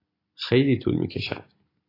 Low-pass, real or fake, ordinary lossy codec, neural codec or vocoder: 5.4 kHz; real; AAC, 24 kbps; none